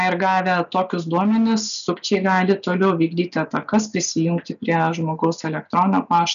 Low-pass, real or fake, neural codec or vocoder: 7.2 kHz; real; none